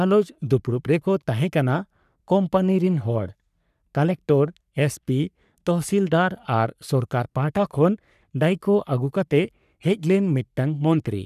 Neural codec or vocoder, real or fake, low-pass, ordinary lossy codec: codec, 44.1 kHz, 3.4 kbps, Pupu-Codec; fake; 14.4 kHz; none